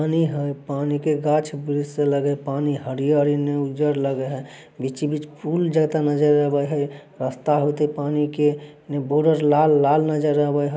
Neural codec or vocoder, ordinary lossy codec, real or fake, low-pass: none; none; real; none